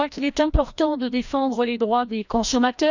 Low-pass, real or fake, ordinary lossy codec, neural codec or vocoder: 7.2 kHz; fake; none; codec, 16 kHz, 1 kbps, FreqCodec, larger model